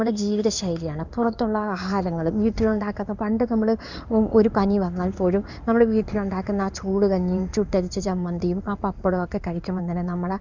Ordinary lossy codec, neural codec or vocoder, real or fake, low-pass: none; codec, 16 kHz in and 24 kHz out, 1 kbps, XY-Tokenizer; fake; 7.2 kHz